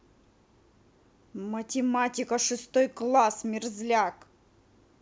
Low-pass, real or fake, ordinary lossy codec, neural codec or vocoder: none; real; none; none